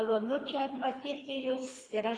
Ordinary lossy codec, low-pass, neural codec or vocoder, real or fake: AAC, 32 kbps; 9.9 kHz; codec, 24 kHz, 3 kbps, HILCodec; fake